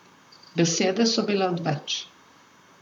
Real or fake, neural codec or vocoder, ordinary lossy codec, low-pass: fake; vocoder, 44.1 kHz, 128 mel bands, Pupu-Vocoder; none; 19.8 kHz